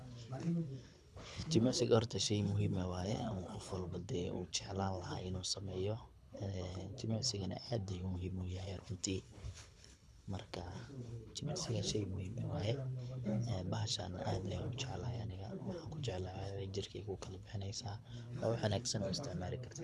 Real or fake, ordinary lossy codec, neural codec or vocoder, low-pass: fake; none; codec, 24 kHz, 6 kbps, HILCodec; none